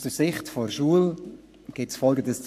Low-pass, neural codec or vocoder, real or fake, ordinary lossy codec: 14.4 kHz; codec, 44.1 kHz, 7.8 kbps, Pupu-Codec; fake; AAC, 96 kbps